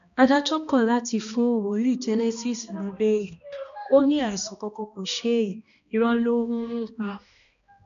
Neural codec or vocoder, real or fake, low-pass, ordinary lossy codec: codec, 16 kHz, 1 kbps, X-Codec, HuBERT features, trained on balanced general audio; fake; 7.2 kHz; none